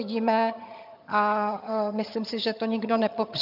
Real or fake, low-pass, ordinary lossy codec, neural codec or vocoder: fake; 5.4 kHz; AAC, 48 kbps; vocoder, 22.05 kHz, 80 mel bands, HiFi-GAN